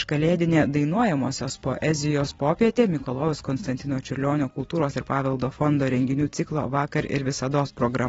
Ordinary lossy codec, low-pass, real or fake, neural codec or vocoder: AAC, 24 kbps; 19.8 kHz; fake; vocoder, 44.1 kHz, 128 mel bands every 256 samples, BigVGAN v2